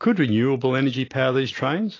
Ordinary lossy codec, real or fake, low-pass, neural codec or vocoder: AAC, 32 kbps; real; 7.2 kHz; none